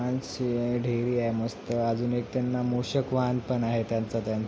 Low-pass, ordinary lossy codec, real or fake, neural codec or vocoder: none; none; real; none